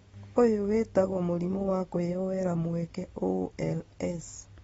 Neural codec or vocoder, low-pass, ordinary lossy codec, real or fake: none; 19.8 kHz; AAC, 24 kbps; real